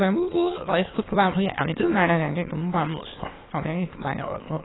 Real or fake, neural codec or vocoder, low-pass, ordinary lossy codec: fake; autoencoder, 22.05 kHz, a latent of 192 numbers a frame, VITS, trained on many speakers; 7.2 kHz; AAC, 16 kbps